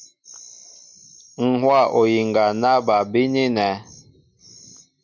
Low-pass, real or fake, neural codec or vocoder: 7.2 kHz; real; none